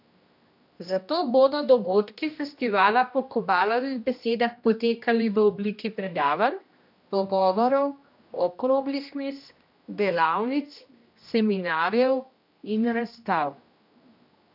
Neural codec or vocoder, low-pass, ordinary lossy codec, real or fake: codec, 16 kHz, 1 kbps, X-Codec, HuBERT features, trained on general audio; 5.4 kHz; none; fake